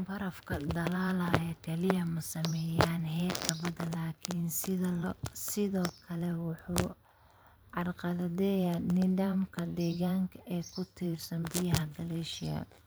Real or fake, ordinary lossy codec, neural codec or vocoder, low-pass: fake; none; vocoder, 44.1 kHz, 128 mel bands every 512 samples, BigVGAN v2; none